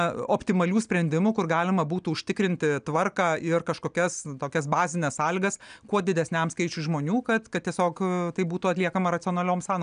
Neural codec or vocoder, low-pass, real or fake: none; 9.9 kHz; real